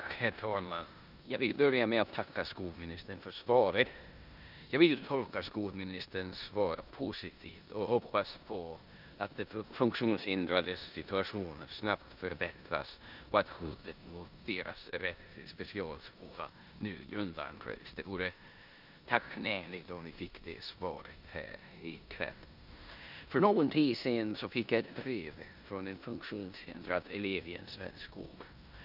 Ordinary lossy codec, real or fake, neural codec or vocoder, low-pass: none; fake; codec, 16 kHz in and 24 kHz out, 0.9 kbps, LongCat-Audio-Codec, four codebook decoder; 5.4 kHz